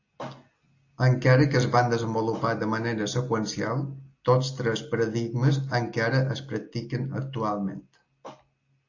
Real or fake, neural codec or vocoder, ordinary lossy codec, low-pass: real; none; AAC, 48 kbps; 7.2 kHz